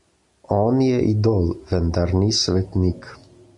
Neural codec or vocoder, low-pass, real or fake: none; 10.8 kHz; real